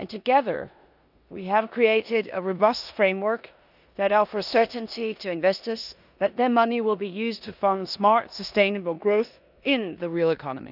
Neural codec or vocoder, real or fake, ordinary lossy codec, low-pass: codec, 16 kHz in and 24 kHz out, 0.9 kbps, LongCat-Audio-Codec, four codebook decoder; fake; none; 5.4 kHz